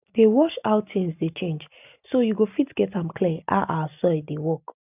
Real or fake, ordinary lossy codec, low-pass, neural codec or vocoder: real; none; 3.6 kHz; none